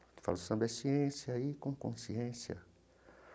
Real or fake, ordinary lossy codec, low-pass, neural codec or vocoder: real; none; none; none